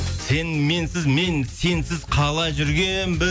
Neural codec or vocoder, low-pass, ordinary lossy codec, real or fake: none; none; none; real